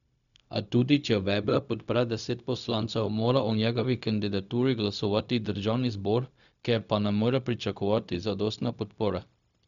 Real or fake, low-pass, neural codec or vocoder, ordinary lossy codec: fake; 7.2 kHz; codec, 16 kHz, 0.4 kbps, LongCat-Audio-Codec; none